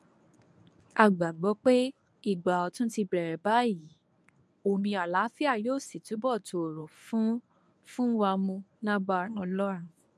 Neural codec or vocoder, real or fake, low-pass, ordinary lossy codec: codec, 24 kHz, 0.9 kbps, WavTokenizer, medium speech release version 1; fake; none; none